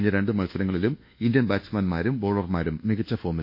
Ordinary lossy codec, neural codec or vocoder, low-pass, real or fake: MP3, 32 kbps; codec, 24 kHz, 1.2 kbps, DualCodec; 5.4 kHz; fake